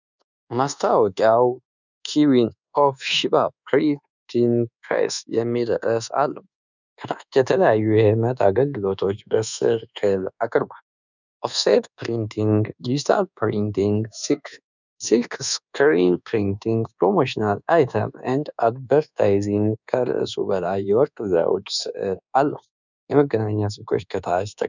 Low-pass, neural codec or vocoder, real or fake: 7.2 kHz; codec, 24 kHz, 1.2 kbps, DualCodec; fake